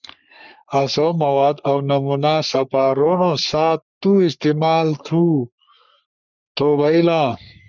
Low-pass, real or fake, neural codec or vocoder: 7.2 kHz; fake; codec, 44.1 kHz, 3.4 kbps, Pupu-Codec